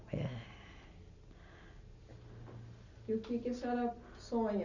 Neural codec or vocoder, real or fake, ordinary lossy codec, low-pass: none; real; none; 7.2 kHz